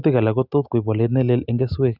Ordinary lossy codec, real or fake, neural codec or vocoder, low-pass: none; real; none; 5.4 kHz